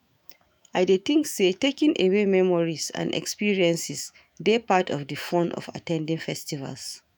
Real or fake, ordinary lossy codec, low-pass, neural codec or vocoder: fake; none; none; autoencoder, 48 kHz, 128 numbers a frame, DAC-VAE, trained on Japanese speech